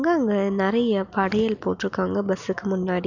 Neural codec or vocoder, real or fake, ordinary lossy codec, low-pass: none; real; none; 7.2 kHz